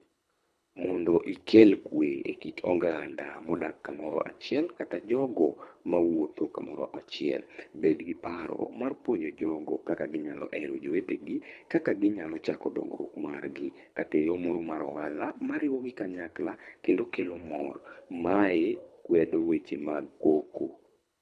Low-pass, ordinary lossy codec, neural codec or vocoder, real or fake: none; none; codec, 24 kHz, 3 kbps, HILCodec; fake